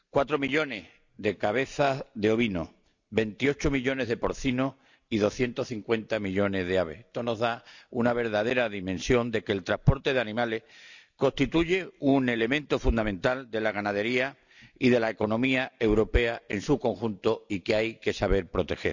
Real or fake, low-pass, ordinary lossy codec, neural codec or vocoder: real; 7.2 kHz; none; none